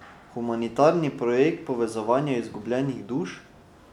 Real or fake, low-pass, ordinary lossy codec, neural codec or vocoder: real; 19.8 kHz; none; none